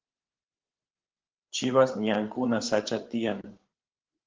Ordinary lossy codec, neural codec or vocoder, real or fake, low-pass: Opus, 16 kbps; codec, 16 kHz, 8 kbps, FreqCodec, larger model; fake; 7.2 kHz